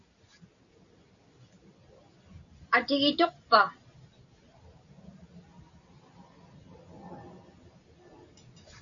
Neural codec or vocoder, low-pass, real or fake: none; 7.2 kHz; real